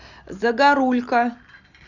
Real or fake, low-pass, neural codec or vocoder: real; 7.2 kHz; none